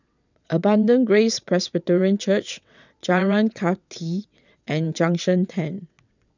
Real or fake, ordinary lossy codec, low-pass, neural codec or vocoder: fake; none; 7.2 kHz; vocoder, 22.05 kHz, 80 mel bands, WaveNeXt